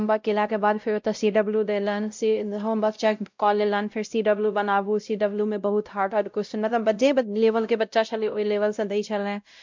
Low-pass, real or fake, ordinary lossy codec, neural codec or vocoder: 7.2 kHz; fake; MP3, 64 kbps; codec, 16 kHz, 0.5 kbps, X-Codec, WavLM features, trained on Multilingual LibriSpeech